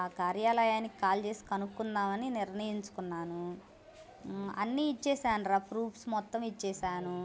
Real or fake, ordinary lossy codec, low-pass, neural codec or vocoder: real; none; none; none